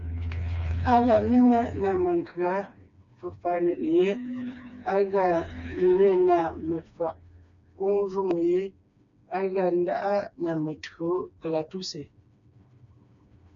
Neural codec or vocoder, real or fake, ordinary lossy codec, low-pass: codec, 16 kHz, 2 kbps, FreqCodec, smaller model; fake; MP3, 64 kbps; 7.2 kHz